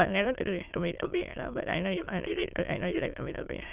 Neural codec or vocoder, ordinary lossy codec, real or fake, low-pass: autoencoder, 22.05 kHz, a latent of 192 numbers a frame, VITS, trained on many speakers; Opus, 24 kbps; fake; 3.6 kHz